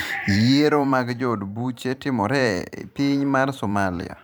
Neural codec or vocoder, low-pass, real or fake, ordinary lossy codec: vocoder, 44.1 kHz, 128 mel bands every 512 samples, BigVGAN v2; none; fake; none